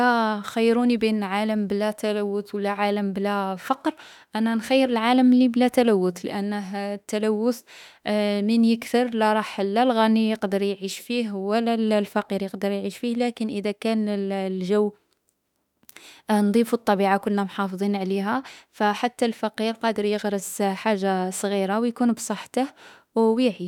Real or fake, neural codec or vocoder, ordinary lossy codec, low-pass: fake; autoencoder, 48 kHz, 32 numbers a frame, DAC-VAE, trained on Japanese speech; none; 19.8 kHz